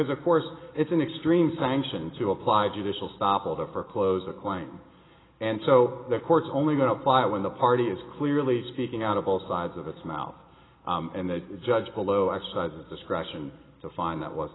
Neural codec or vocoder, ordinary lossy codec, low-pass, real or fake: none; AAC, 16 kbps; 7.2 kHz; real